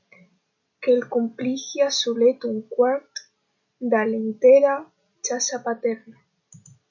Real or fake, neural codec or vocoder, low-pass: real; none; 7.2 kHz